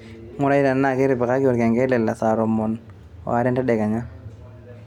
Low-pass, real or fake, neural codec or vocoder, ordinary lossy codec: 19.8 kHz; real; none; none